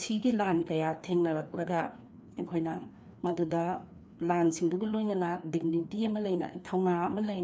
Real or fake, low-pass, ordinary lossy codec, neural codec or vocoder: fake; none; none; codec, 16 kHz, 2 kbps, FreqCodec, larger model